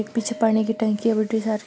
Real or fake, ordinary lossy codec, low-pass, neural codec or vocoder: real; none; none; none